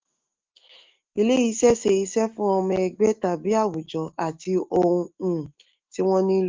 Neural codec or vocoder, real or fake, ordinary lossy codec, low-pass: autoencoder, 48 kHz, 128 numbers a frame, DAC-VAE, trained on Japanese speech; fake; Opus, 32 kbps; 7.2 kHz